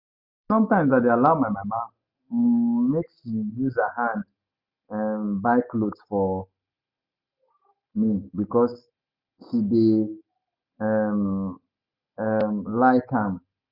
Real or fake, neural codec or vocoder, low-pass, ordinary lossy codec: real; none; 5.4 kHz; Opus, 64 kbps